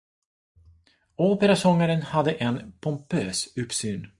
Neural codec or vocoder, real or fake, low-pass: none; real; 10.8 kHz